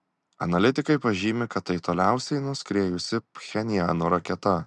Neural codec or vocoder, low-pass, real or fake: none; 10.8 kHz; real